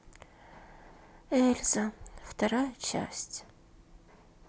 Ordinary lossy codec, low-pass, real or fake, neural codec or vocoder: none; none; real; none